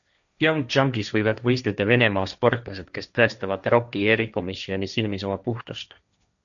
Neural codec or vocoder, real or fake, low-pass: codec, 16 kHz, 1.1 kbps, Voila-Tokenizer; fake; 7.2 kHz